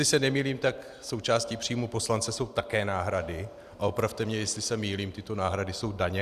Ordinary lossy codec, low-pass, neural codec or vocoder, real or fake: Opus, 64 kbps; 14.4 kHz; none; real